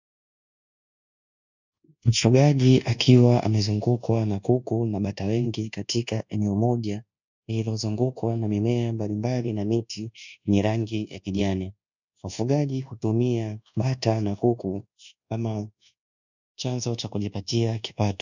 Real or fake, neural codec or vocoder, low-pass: fake; codec, 24 kHz, 1.2 kbps, DualCodec; 7.2 kHz